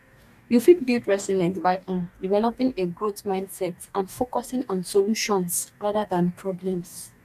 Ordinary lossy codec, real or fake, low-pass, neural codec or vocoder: none; fake; 14.4 kHz; codec, 44.1 kHz, 2.6 kbps, DAC